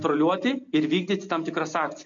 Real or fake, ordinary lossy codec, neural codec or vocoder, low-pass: real; MP3, 48 kbps; none; 7.2 kHz